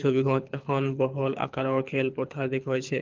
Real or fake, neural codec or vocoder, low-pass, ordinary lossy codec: fake; codec, 24 kHz, 6 kbps, HILCodec; 7.2 kHz; Opus, 32 kbps